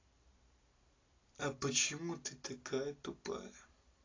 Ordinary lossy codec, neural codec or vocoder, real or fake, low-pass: AAC, 32 kbps; none; real; 7.2 kHz